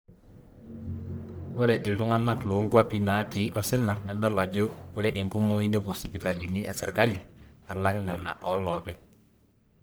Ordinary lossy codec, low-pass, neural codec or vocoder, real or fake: none; none; codec, 44.1 kHz, 1.7 kbps, Pupu-Codec; fake